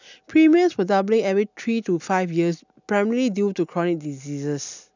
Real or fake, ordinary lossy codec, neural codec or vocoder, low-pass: real; none; none; 7.2 kHz